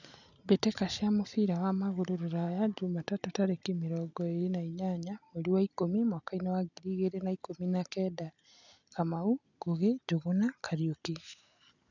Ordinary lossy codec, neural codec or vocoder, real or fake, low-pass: none; none; real; 7.2 kHz